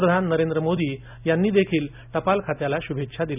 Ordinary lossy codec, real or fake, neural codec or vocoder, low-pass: none; real; none; 3.6 kHz